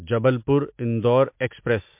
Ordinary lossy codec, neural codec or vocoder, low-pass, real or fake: MP3, 32 kbps; none; 3.6 kHz; real